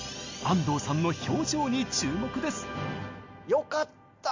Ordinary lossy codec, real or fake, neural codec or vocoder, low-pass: MP3, 48 kbps; real; none; 7.2 kHz